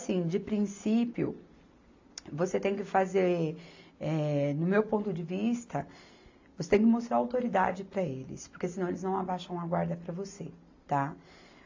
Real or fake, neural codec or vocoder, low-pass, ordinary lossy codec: real; none; 7.2 kHz; AAC, 48 kbps